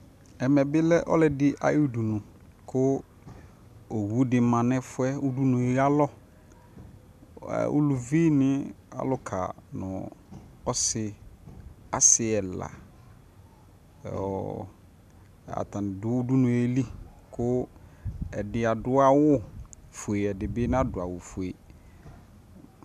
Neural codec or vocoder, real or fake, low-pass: none; real; 14.4 kHz